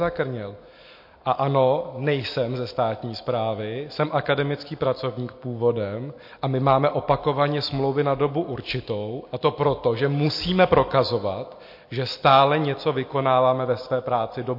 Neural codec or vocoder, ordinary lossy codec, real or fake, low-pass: none; MP3, 32 kbps; real; 5.4 kHz